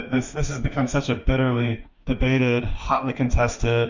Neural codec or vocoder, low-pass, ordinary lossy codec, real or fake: autoencoder, 48 kHz, 32 numbers a frame, DAC-VAE, trained on Japanese speech; 7.2 kHz; Opus, 64 kbps; fake